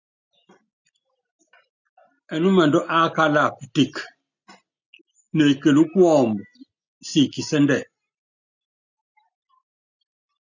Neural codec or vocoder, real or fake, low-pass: none; real; 7.2 kHz